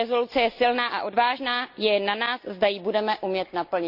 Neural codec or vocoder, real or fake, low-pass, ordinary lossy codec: none; real; 5.4 kHz; none